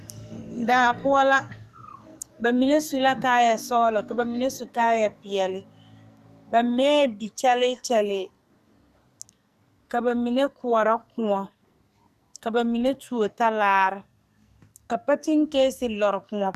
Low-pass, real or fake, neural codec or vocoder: 14.4 kHz; fake; codec, 44.1 kHz, 2.6 kbps, SNAC